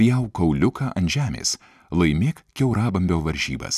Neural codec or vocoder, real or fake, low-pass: none; real; 14.4 kHz